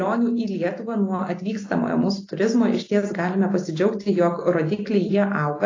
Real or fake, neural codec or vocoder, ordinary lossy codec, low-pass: real; none; AAC, 32 kbps; 7.2 kHz